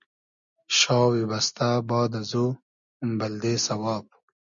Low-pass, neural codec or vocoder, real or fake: 7.2 kHz; none; real